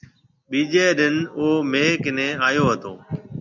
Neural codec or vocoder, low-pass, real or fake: none; 7.2 kHz; real